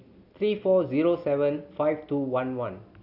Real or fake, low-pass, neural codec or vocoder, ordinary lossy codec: real; 5.4 kHz; none; none